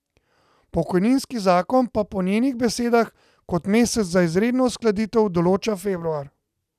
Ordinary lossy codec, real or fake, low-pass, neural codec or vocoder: none; real; 14.4 kHz; none